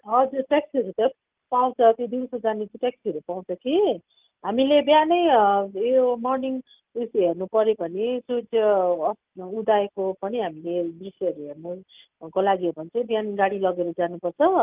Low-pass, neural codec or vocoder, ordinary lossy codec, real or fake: 3.6 kHz; none; Opus, 32 kbps; real